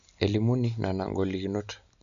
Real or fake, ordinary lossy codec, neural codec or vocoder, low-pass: real; none; none; 7.2 kHz